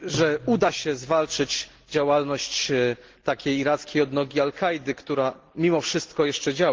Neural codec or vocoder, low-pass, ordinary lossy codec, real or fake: none; 7.2 kHz; Opus, 16 kbps; real